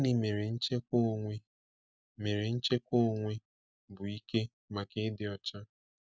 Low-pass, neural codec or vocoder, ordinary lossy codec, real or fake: none; none; none; real